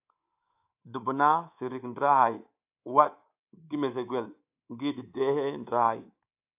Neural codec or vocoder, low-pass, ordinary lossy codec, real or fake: codec, 24 kHz, 3.1 kbps, DualCodec; 3.6 kHz; AAC, 32 kbps; fake